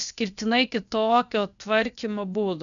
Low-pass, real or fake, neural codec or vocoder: 7.2 kHz; fake; codec, 16 kHz, about 1 kbps, DyCAST, with the encoder's durations